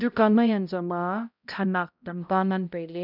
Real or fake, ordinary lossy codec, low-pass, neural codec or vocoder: fake; none; 5.4 kHz; codec, 16 kHz, 0.5 kbps, X-Codec, HuBERT features, trained on balanced general audio